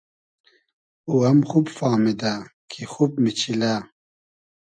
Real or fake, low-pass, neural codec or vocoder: real; 9.9 kHz; none